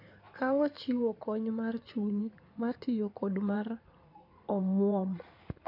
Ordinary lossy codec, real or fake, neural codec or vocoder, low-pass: none; fake; codec, 16 kHz in and 24 kHz out, 2.2 kbps, FireRedTTS-2 codec; 5.4 kHz